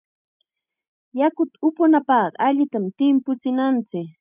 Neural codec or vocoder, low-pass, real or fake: none; 3.6 kHz; real